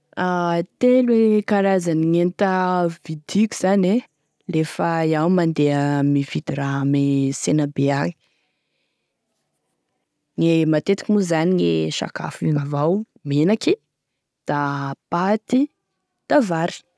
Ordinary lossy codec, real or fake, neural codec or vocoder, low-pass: none; real; none; none